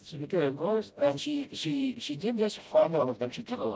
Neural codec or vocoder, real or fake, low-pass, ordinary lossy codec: codec, 16 kHz, 0.5 kbps, FreqCodec, smaller model; fake; none; none